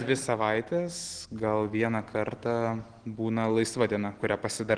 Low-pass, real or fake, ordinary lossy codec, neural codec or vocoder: 9.9 kHz; real; Opus, 16 kbps; none